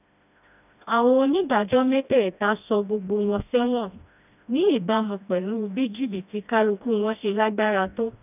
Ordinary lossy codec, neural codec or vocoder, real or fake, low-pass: none; codec, 16 kHz, 1 kbps, FreqCodec, smaller model; fake; 3.6 kHz